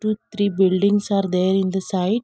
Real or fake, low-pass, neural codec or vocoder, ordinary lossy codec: real; none; none; none